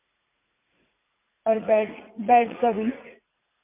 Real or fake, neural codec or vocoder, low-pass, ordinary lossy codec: fake; vocoder, 44.1 kHz, 80 mel bands, Vocos; 3.6 kHz; MP3, 24 kbps